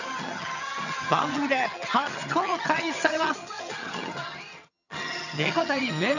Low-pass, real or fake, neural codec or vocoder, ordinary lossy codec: 7.2 kHz; fake; vocoder, 22.05 kHz, 80 mel bands, HiFi-GAN; none